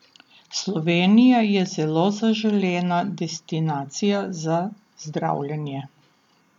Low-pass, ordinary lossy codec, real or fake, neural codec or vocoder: 19.8 kHz; none; real; none